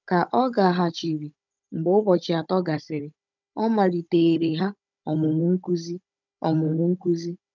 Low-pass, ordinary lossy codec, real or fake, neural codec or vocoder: 7.2 kHz; none; fake; codec, 16 kHz, 16 kbps, FunCodec, trained on Chinese and English, 50 frames a second